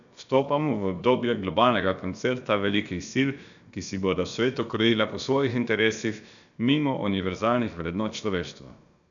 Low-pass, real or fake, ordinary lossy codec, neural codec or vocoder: 7.2 kHz; fake; none; codec, 16 kHz, about 1 kbps, DyCAST, with the encoder's durations